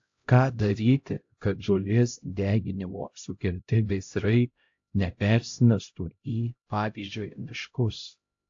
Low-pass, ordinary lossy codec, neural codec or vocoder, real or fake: 7.2 kHz; AAC, 48 kbps; codec, 16 kHz, 0.5 kbps, X-Codec, HuBERT features, trained on LibriSpeech; fake